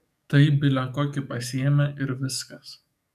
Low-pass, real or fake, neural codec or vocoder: 14.4 kHz; fake; codec, 44.1 kHz, 7.8 kbps, DAC